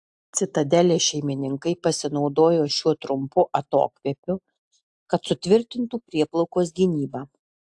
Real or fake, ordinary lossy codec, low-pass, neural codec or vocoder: real; AAC, 64 kbps; 10.8 kHz; none